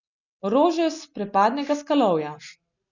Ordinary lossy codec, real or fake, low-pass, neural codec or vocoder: none; real; 7.2 kHz; none